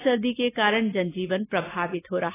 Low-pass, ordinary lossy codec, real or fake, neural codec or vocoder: 3.6 kHz; AAC, 16 kbps; real; none